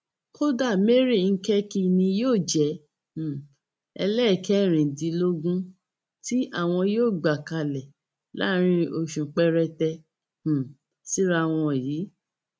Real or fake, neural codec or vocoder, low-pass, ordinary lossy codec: real; none; none; none